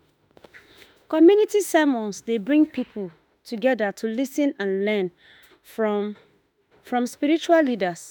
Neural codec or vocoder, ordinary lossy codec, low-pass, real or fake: autoencoder, 48 kHz, 32 numbers a frame, DAC-VAE, trained on Japanese speech; none; 19.8 kHz; fake